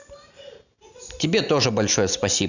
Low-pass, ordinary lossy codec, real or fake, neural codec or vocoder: 7.2 kHz; none; real; none